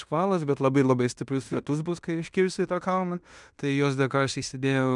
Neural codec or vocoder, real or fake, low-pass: codec, 16 kHz in and 24 kHz out, 0.9 kbps, LongCat-Audio-Codec, fine tuned four codebook decoder; fake; 10.8 kHz